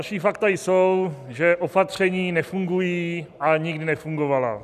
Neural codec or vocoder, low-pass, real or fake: none; 14.4 kHz; real